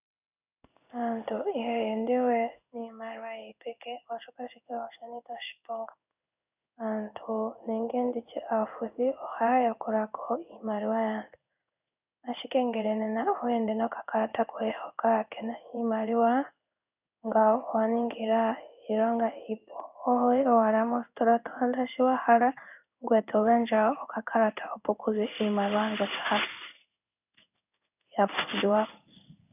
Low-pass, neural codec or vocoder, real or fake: 3.6 kHz; codec, 16 kHz in and 24 kHz out, 1 kbps, XY-Tokenizer; fake